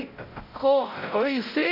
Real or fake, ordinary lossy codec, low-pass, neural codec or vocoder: fake; none; 5.4 kHz; codec, 16 kHz, 0.5 kbps, X-Codec, WavLM features, trained on Multilingual LibriSpeech